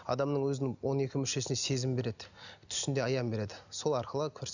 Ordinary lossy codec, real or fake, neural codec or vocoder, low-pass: none; real; none; 7.2 kHz